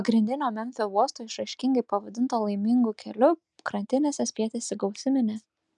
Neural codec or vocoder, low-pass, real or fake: none; 10.8 kHz; real